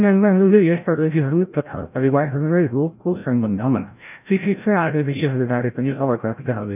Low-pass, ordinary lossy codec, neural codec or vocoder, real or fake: 3.6 kHz; none; codec, 16 kHz, 0.5 kbps, FreqCodec, larger model; fake